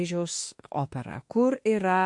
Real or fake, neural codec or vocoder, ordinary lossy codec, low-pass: fake; autoencoder, 48 kHz, 32 numbers a frame, DAC-VAE, trained on Japanese speech; MP3, 48 kbps; 10.8 kHz